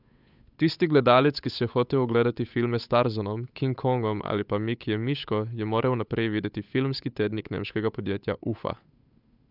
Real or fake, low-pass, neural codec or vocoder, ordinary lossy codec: fake; 5.4 kHz; codec, 16 kHz, 8 kbps, FunCodec, trained on Chinese and English, 25 frames a second; none